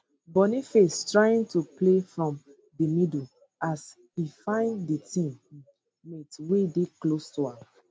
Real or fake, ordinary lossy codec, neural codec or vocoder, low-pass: real; none; none; none